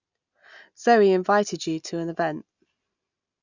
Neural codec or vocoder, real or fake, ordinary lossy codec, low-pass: none; real; none; 7.2 kHz